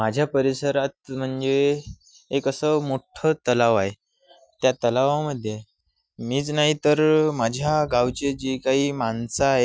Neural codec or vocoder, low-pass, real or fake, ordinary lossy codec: none; none; real; none